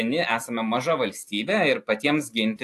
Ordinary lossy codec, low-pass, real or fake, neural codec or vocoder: AAC, 64 kbps; 14.4 kHz; real; none